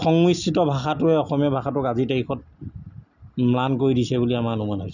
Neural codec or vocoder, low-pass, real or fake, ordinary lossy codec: none; 7.2 kHz; real; none